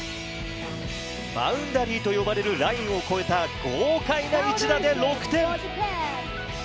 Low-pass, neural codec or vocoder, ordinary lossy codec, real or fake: none; none; none; real